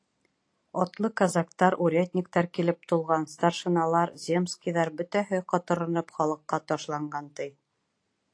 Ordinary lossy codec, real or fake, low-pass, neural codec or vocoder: AAC, 48 kbps; real; 9.9 kHz; none